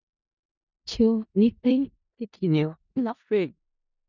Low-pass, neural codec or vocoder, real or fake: 7.2 kHz; codec, 16 kHz in and 24 kHz out, 0.4 kbps, LongCat-Audio-Codec, four codebook decoder; fake